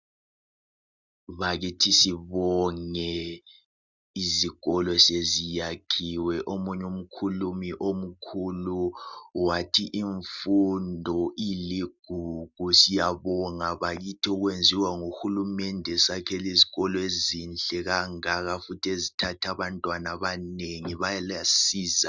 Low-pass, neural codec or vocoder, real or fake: 7.2 kHz; none; real